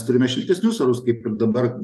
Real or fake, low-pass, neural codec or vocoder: real; 14.4 kHz; none